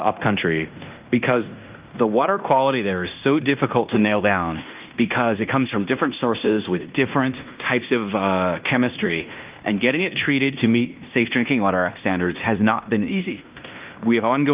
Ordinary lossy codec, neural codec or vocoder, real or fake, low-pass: Opus, 24 kbps; codec, 16 kHz in and 24 kHz out, 0.9 kbps, LongCat-Audio-Codec, fine tuned four codebook decoder; fake; 3.6 kHz